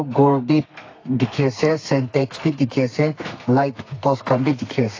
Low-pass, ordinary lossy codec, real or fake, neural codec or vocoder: 7.2 kHz; AAC, 32 kbps; fake; codec, 32 kHz, 1.9 kbps, SNAC